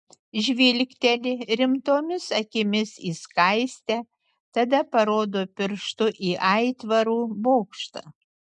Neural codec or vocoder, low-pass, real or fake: none; 10.8 kHz; real